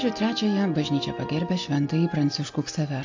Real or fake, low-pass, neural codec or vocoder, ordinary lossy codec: real; 7.2 kHz; none; AAC, 48 kbps